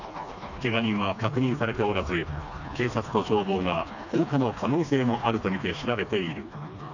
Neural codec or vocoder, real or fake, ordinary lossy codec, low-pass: codec, 16 kHz, 2 kbps, FreqCodec, smaller model; fake; none; 7.2 kHz